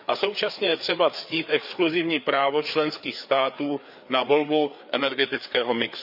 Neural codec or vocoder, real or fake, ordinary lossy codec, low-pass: codec, 16 kHz, 4 kbps, FreqCodec, larger model; fake; none; 5.4 kHz